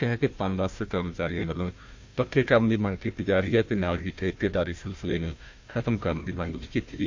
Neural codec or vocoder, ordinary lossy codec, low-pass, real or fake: codec, 16 kHz, 1 kbps, FunCodec, trained on Chinese and English, 50 frames a second; MP3, 48 kbps; 7.2 kHz; fake